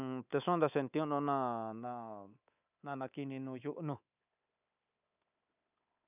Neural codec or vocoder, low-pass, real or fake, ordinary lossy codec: codec, 24 kHz, 3.1 kbps, DualCodec; 3.6 kHz; fake; none